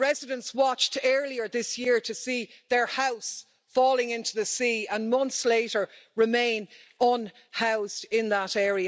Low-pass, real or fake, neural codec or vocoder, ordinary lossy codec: none; real; none; none